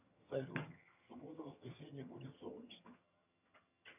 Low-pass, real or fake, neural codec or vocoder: 3.6 kHz; fake; vocoder, 22.05 kHz, 80 mel bands, HiFi-GAN